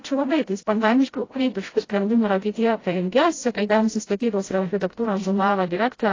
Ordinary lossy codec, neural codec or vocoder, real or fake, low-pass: AAC, 32 kbps; codec, 16 kHz, 0.5 kbps, FreqCodec, smaller model; fake; 7.2 kHz